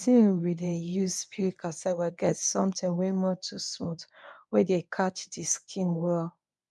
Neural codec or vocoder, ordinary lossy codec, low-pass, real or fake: codec, 24 kHz, 0.9 kbps, WavTokenizer, medium speech release version 1; none; none; fake